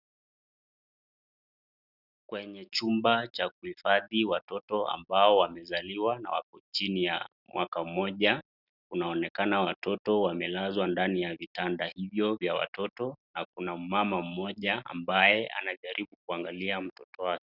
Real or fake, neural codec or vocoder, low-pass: real; none; 5.4 kHz